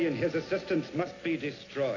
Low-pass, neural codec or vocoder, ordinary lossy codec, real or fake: 7.2 kHz; none; AAC, 32 kbps; real